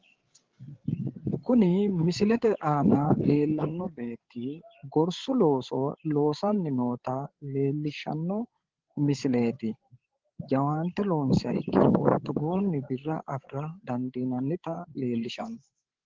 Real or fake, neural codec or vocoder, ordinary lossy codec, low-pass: fake; codec, 16 kHz, 8 kbps, FreqCodec, larger model; Opus, 16 kbps; 7.2 kHz